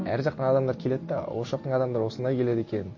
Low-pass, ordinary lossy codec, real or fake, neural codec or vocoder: 7.2 kHz; MP3, 32 kbps; real; none